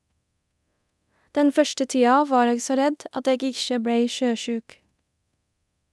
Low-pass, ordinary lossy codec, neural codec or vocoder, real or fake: none; none; codec, 24 kHz, 0.9 kbps, DualCodec; fake